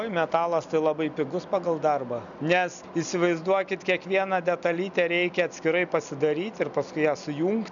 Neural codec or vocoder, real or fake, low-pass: none; real; 7.2 kHz